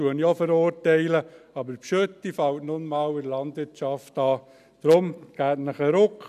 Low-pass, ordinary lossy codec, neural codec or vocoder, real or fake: 14.4 kHz; none; none; real